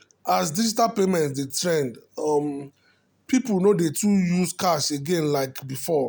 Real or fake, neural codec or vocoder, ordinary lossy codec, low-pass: real; none; none; none